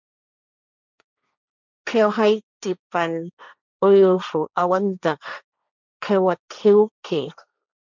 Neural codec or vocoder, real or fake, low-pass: codec, 16 kHz, 1.1 kbps, Voila-Tokenizer; fake; 7.2 kHz